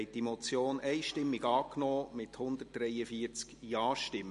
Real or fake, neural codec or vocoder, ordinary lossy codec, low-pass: real; none; MP3, 48 kbps; 14.4 kHz